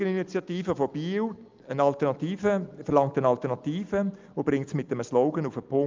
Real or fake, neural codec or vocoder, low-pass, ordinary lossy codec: real; none; 7.2 kHz; Opus, 24 kbps